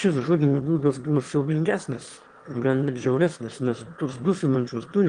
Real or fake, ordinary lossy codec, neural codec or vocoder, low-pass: fake; Opus, 24 kbps; autoencoder, 22.05 kHz, a latent of 192 numbers a frame, VITS, trained on one speaker; 9.9 kHz